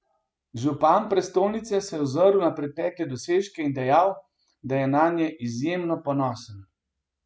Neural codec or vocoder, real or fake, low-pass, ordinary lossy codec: none; real; none; none